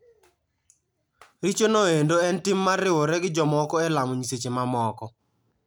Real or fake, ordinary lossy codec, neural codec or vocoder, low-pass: real; none; none; none